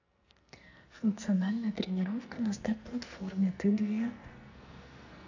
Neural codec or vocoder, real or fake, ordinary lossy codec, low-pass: codec, 44.1 kHz, 2.6 kbps, SNAC; fake; AAC, 48 kbps; 7.2 kHz